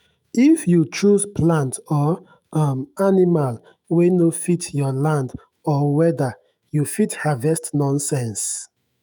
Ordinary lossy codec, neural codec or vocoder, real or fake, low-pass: none; autoencoder, 48 kHz, 128 numbers a frame, DAC-VAE, trained on Japanese speech; fake; none